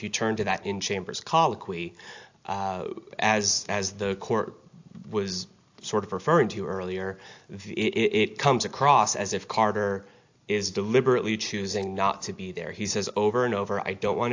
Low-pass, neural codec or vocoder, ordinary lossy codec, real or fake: 7.2 kHz; none; AAC, 48 kbps; real